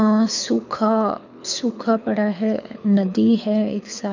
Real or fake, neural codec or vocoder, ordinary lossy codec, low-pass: fake; codec, 24 kHz, 6 kbps, HILCodec; none; 7.2 kHz